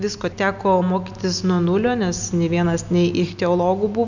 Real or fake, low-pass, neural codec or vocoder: real; 7.2 kHz; none